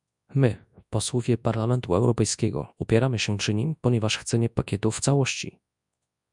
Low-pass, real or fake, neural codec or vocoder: 10.8 kHz; fake; codec, 24 kHz, 0.9 kbps, WavTokenizer, large speech release